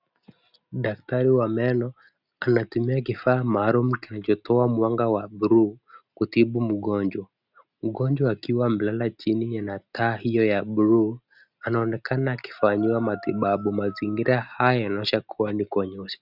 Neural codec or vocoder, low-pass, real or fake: none; 5.4 kHz; real